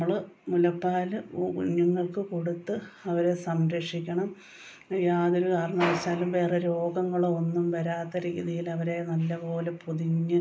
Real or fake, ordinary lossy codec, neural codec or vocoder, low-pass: real; none; none; none